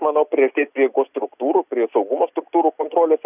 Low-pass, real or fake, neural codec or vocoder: 3.6 kHz; real; none